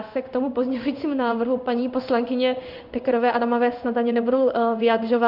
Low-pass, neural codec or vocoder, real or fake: 5.4 kHz; codec, 16 kHz in and 24 kHz out, 1 kbps, XY-Tokenizer; fake